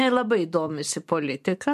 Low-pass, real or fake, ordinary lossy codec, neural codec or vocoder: 14.4 kHz; fake; MP3, 64 kbps; vocoder, 44.1 kHz, 128 mel bands every 512 samples, BigVGAN v2